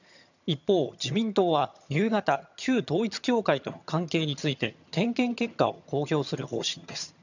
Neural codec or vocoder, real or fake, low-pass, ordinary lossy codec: vocoder, 22.05 kHz, 80 mel bands, HiFi-GAN; fake; 7.2 kHz; none